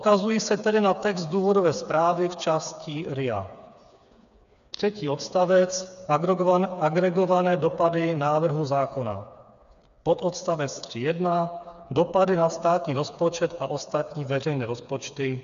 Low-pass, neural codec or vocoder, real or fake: 7.2 kHz; codec, 16 kHz, 4 kbps, FreqCodec, smaller model; fake